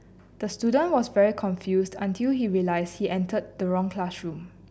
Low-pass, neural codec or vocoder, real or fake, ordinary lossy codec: none; none; real; none